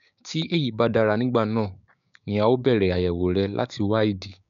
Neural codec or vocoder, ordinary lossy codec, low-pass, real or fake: codec, 16 kHz, 6 kbps, DAC; none; 7.2 kHz; fake